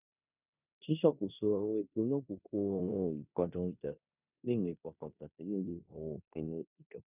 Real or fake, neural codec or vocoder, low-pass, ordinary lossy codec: fake; codec, 16 kHz in and 24 kHz out, 0.9 kbps, LongCat-Audio-Codec, four codebook decoder; 3.6 kHz; none